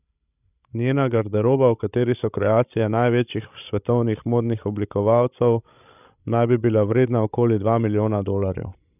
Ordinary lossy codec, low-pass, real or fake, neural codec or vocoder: none; 3.6 kHz; fake; codec, 16 kHz, 16 kbps, FreqCodec, larger model